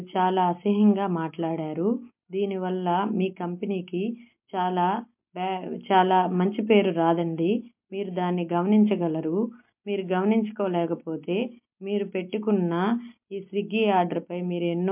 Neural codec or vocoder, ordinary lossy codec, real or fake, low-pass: none; MP3, 32 kbps; real; 3.6 kHz